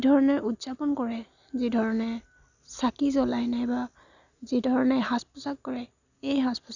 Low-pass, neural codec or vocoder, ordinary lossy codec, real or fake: 7.2 kHz; none; none; real